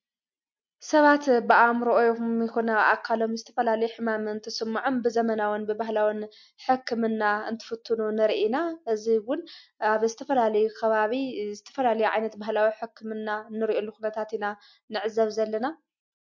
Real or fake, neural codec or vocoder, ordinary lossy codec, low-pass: real; none; MP3, 48 kbps; 7.2 kHz